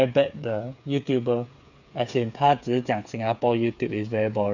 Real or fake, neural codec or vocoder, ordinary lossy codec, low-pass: fake; codec, 16 kHz, 8 kbps, FreqCodec, smaller model; none; 7.2 kHz